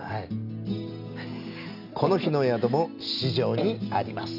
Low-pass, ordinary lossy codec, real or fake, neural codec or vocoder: 5.4 kHz; none; real; none